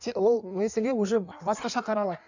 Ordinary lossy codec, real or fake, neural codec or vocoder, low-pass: none; fake; codec, 16 kHz in and 24 kHz out, 1.1 kbps, FireRedTTS-2 codec; 7.2 kHz